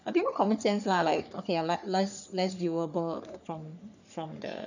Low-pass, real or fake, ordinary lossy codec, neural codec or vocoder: 7.2 kHz; fake; none; codec, 44.1 kHz, 3.4 kbps, Pupu-Codec